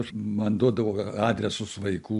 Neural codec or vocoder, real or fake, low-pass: none; real; 10.8 kHz